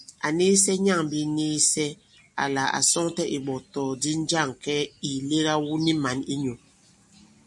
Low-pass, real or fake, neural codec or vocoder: 10.8 kHz; real; none